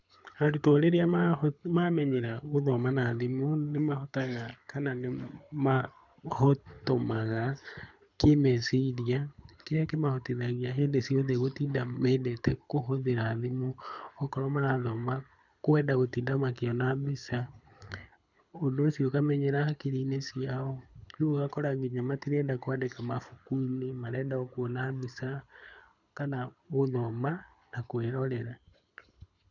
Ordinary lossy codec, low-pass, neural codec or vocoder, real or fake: none; 7.2 kHz; codec, 24 kHz, 6 kbps, HILCodec; fake